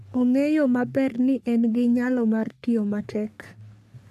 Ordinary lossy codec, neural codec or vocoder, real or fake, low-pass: none; codec, 44.1 kHz, 3.4 kbps, Pupu-Codec; fake; 14.4 kHz